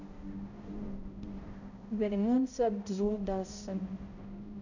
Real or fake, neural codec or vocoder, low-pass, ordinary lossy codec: fake; codec, 16 kHz, 0.5 kbps, X-Codec, HuBERT features, trained on balanced general audio; 7.2 kHz; none